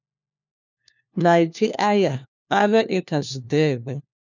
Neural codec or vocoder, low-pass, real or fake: codec, 16 kHz, 1 kbps, FunCodec, trained on LibriTTS, 50 frames a second; 7.2 kHz; fake